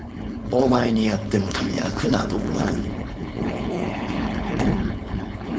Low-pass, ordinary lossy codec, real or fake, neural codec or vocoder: none; none; fake; codec, 16 kHz, 4.8 kbps, FACodec